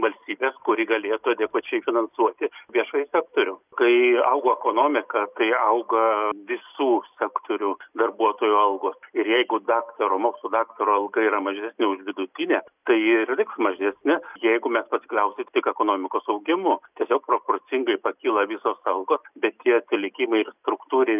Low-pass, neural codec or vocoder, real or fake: 3.6 kHz; none; real